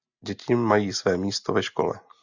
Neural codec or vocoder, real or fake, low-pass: none; real; 7.2 kHz